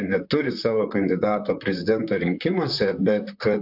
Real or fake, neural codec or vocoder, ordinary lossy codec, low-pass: fake; vocoder, 44.1 kHz, 128 mel bands, Pupu-Vocoder; AAC, 48 kbps; 5.4 kHz